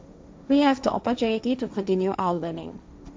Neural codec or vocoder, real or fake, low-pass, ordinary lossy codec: codec, 16 kHz, 1.1 kbps, Voila-Tokenizer; fake; none; none